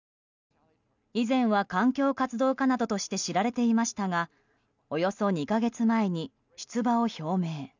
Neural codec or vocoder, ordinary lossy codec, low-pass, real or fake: none; none; 7.2 kHz; real